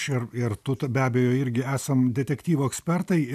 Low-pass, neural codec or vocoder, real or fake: 14.4 kHz; none; real